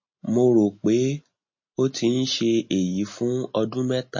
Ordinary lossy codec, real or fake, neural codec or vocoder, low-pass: MP3, 32 kbps; real; none; 7.2 kHz